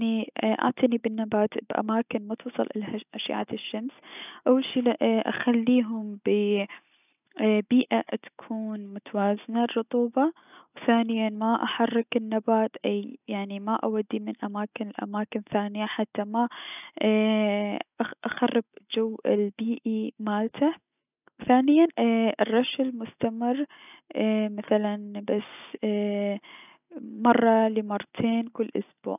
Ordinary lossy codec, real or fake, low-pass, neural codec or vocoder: none; real; 3.6 kHz; none